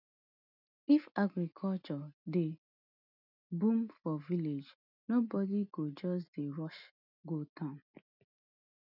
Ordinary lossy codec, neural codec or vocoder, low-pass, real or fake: none; none; 5.4 kHz; real